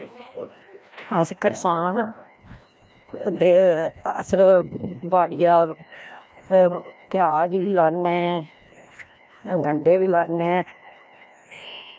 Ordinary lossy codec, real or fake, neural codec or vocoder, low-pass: none; fake; codec, 16 kHz, 1 kbps, FreqCodec, larger model; none